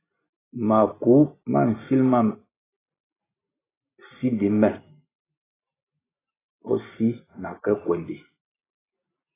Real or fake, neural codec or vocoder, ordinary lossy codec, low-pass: real; none; AAC, 16 kbps; 3.6 kHz